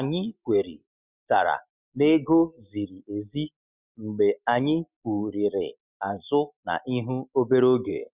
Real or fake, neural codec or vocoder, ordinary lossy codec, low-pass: real; none; Opus, 64 kbps; 3.6 kHz